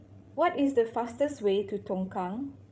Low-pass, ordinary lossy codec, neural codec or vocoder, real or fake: none; none; codec, 16 kHz, 16 kbps, FreqCodec, larger model; fake